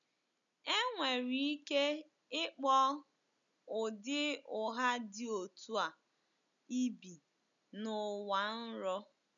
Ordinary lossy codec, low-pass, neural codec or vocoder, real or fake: none; 7.2 kHz; none; real